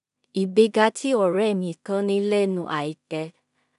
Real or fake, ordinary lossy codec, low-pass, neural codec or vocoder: fake; none; 10.8 kHz; codec, 16 kHz in and 24 kHz out, 0.4 kbps, LongCat-Audio-Codec, two codebook decoder